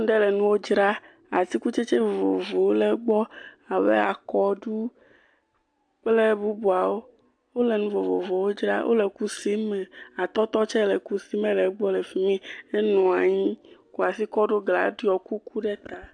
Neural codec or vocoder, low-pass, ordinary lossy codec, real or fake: none; 9.9 kHz; AAC, 64 kbps; real